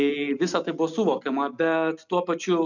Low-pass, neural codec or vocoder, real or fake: 7.2 kHz; none; real